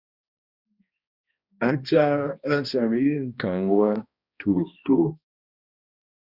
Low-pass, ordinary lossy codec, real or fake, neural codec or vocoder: 5.4 kHz; Opus, 64 kbps; fake; codec, 16 kHz, 1 kbps, X-Codec, HuBERT features, trained on balanced general audio